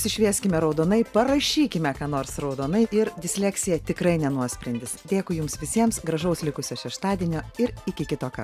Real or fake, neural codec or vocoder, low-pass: real; none; 14.4 kHz